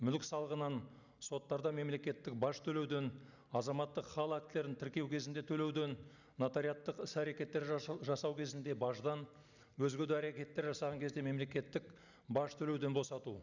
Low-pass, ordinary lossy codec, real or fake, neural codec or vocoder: 7.2 kHz; none; real; none